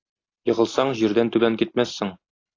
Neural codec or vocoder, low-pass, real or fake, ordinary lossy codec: none; 7.2 kHz; real; AAC, 32 kbps